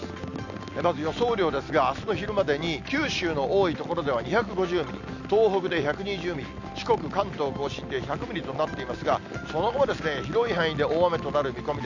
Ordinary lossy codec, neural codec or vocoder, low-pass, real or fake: none; none; 7.2 kHz; real